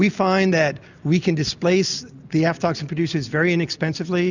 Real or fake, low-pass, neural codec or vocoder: real; 7.2 kHz; none